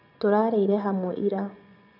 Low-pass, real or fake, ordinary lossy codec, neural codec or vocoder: 5.4 kHz; fake; none; vocoder, 24 kHz, 100 mel bands, Vocos